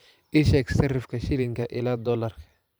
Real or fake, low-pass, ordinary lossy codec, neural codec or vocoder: fake; none; none; vocoder, 44.1 kHz, 128 mel bands every 512 samples, BigVGAN v2